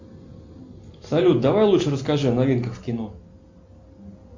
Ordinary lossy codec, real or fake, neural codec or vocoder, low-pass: MP3, 48 kbps; real; none; 7.2 kHz